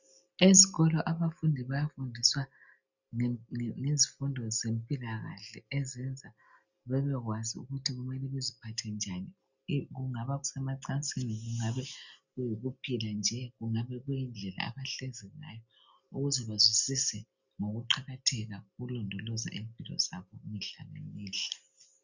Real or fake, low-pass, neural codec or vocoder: real; 7.2 kHz; none